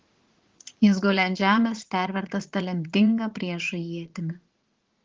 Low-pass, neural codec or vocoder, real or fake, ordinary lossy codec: 7.2 kHz; vocoder, 44.1 kHz, 80 mel bands, Vocos; fake; Opus, 16 kbps